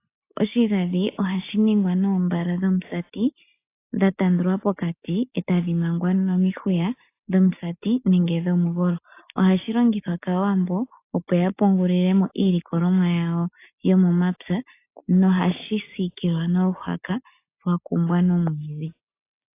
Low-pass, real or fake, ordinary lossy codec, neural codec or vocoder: 3.6 kHz; real; AAC, 24 kbps; none